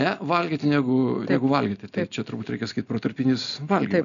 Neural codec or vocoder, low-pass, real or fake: none; 7.2 kHz; real